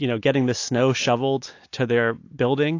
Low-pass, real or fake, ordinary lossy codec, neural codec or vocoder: 7.2 kHz; real; AAC, 48 kbps; none